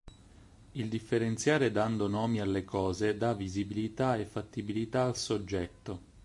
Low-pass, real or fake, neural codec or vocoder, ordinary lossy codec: 10.8 kHz; real; none; MP3, 48 kbps